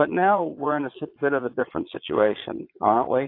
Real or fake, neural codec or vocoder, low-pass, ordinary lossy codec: fake; vocoder, 22.05 kHz, 80 mel bands, Vocos; 5.4 kHz; AAC, 32 kbps